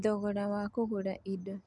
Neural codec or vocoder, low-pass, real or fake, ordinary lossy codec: none; 10.8 kHz; real; none